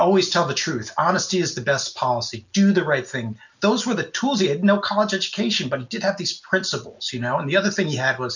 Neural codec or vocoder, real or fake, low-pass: none; real; 7.2 kHz